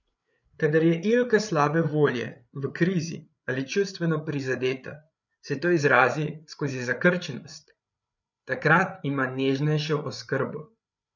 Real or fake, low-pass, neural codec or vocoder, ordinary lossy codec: fake; 7.2 kHz; codec, 16 kHz, 8 kbps, FreqCodec, larger model; none